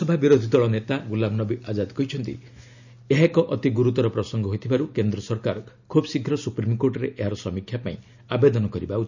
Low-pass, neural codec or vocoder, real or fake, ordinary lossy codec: 7.2 kHz; none; real; none